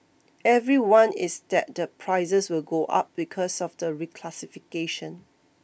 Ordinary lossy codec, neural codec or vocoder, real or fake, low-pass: none; none; real; none